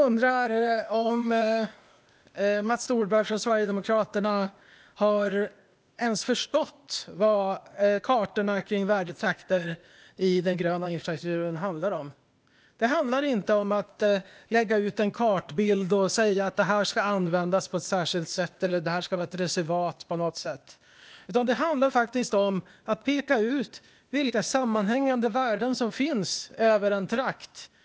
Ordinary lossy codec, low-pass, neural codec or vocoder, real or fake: none; none; codec, 16 kHz, 0.8 kbps, ZipCodec; fake